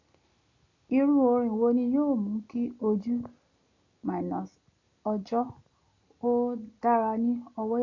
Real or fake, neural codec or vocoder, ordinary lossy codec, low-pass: real; none; MP3, 64 kbps; 7.2 kHz